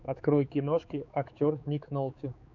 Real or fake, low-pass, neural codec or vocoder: fake; 7.2 kHz; codec, 16 kHz, 4 kbps, X-Codec, HuBERT features, trained on balanced general audio